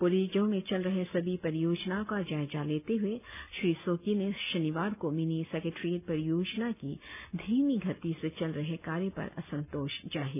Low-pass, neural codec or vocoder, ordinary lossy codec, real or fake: 3.6 kHz; none; none; real